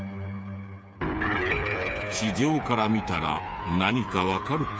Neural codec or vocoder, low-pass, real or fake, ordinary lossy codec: codec, 16 kHz, 8 kbps, FreqCodec, smaller model; none; fake; none